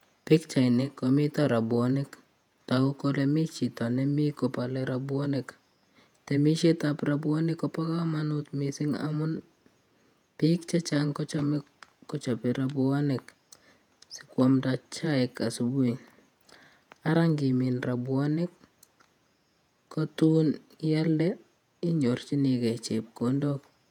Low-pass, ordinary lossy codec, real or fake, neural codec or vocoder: 19.8 kHz; none; fake; vocoder, 48 kHz, 128 mel bands, Vocos